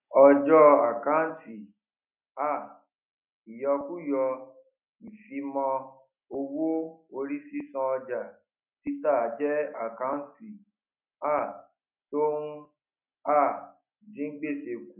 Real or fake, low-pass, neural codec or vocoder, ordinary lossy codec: real; 3.6 kHz; none; none